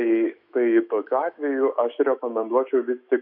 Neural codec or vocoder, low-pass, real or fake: autoencoder, 48 kHz, 128 numbers a frame, DAC-VAE, trained on Japanese speech; 5.4 kHz; fake